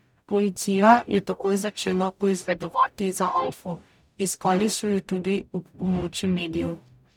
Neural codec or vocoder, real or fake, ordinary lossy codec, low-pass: codec, 44.1 kHz, 0.9 kbps, DAC; fake; none; 19.8 kHz